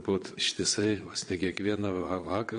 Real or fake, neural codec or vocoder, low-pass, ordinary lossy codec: fake; vocoder, 22.05 kHz, 80 mel bands, Vocos; 9.9 kHz; MP3, 48 kbps